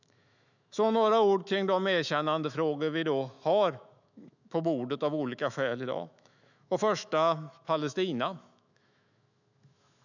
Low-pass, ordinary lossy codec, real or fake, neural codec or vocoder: 7.2 kHz; none; fake; autoencoder, 48 kHz, 128 numbers a frame, DAC-VAE, trained on Japanese speech